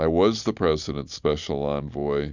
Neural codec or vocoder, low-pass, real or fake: none; 7.2 kHz; real